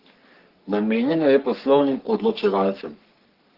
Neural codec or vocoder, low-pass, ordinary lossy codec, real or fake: codec, 44.1 kHz, 3.4 kbps, Pupu-Codec; 5.4 kHz; Opus, 16 kbps; fake